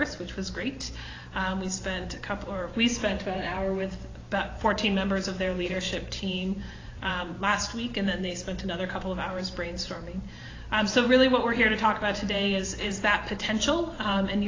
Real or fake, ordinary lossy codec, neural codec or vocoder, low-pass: fake; AAC, 32 kbps; vocoder, 44.1 kHz, 128 mel bands every 512 samples, BigVGAN v2; 7.2 kHz